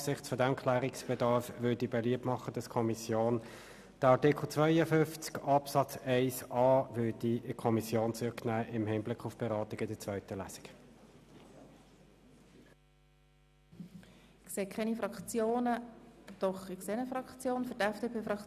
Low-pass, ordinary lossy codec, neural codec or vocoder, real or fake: 14.4 kHz; none; none; real